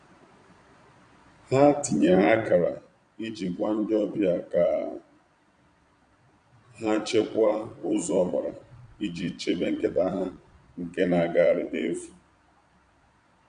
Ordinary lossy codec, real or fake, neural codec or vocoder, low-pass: none; fake; vocoder, 22.05 kHz, 80 mel bands, Vocos; 9.9 kHz